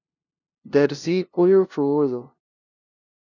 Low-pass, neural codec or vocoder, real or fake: 7.2 kHz; codec, 16 kHz, 0.5 kbps, FunCodec, trained on LibriTTS, 25 frames a second; fake